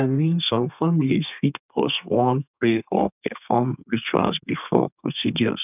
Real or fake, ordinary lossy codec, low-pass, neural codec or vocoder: fake; none; 3.6 kHz; codec, 32 kHz, 1.9 kbps, SNAC